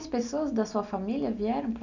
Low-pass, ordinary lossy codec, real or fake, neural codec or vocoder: 7.2 kHz; none; real; none